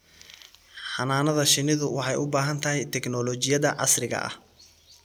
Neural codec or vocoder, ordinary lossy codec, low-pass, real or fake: none; none; none; real